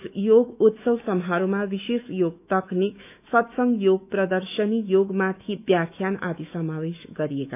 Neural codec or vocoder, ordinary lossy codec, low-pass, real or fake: autoencoder, 48 kHz, 128 numbers a frame, DAC-VAE, trained on Japanese speech; none; 3.6 kHz; fake